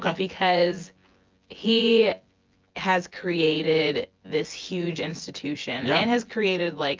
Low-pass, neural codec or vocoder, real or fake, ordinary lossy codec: 7.2 kHz; vocoder, 24 kHz, 100 mel bands, Vocos; fake; Opus, 24 kbps